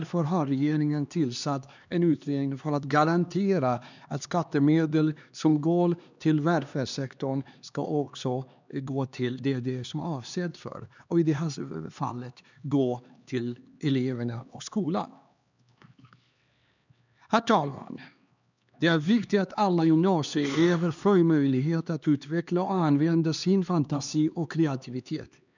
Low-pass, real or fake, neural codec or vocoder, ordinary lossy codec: 7.2 kHz; fake; codec, 16 kHz, 2 kbps, X-Codec, HuBERT features, trained on LibriSpeech; none